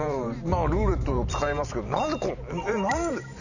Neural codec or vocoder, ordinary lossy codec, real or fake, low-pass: vocoder, 44.1 kHz, 128 mel bands every 512 samples, BigVGAN v2; none; fake; 7.2 kHz